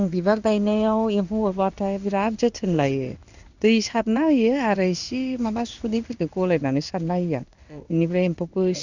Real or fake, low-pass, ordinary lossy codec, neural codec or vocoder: fake; 7.2 kHz; none; codec, 16 kHz in and 24 kHz out, 1 kbps, XY-Tokenizer